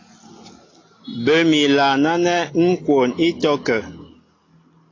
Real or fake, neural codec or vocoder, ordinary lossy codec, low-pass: real; none; AAC, 32 kbps; 7.2 kHz